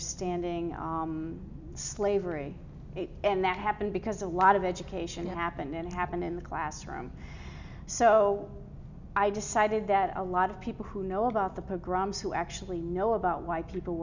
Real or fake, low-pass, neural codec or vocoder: real; 7.2 kHz; none